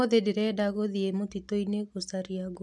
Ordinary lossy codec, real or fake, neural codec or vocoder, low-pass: none; real; none; none